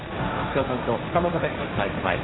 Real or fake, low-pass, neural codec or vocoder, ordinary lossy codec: fake; 7.2 kHz; codec, 16 kHz, 1.1 kbps, Voila-Tokenizer; AAC, 16 kbps